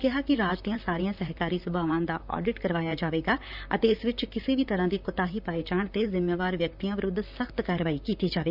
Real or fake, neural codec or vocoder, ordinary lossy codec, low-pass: fake; vocoder, 44.1 kHz, 128 mel bands, Pupu-Vocoder; none; 5.4 kHz